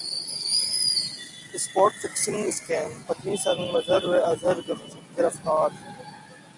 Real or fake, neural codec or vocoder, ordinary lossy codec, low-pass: real; none; MP3, 96 kbps; 10.8 kHz